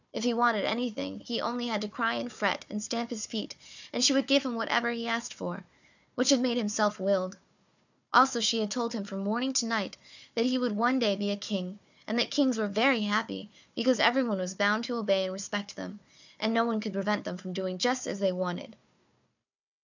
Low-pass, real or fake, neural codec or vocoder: 7.2 kHz; fake; codec, 16 kHz, 4 kbps, FunCodec, trained on Chinese and English, 50 frames a second